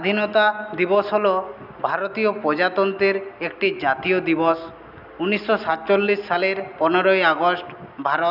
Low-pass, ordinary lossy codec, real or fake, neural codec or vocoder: 5.4 kHz; none; real; none